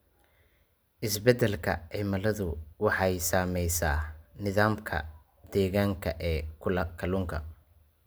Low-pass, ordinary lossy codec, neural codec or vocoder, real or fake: none; none; none; real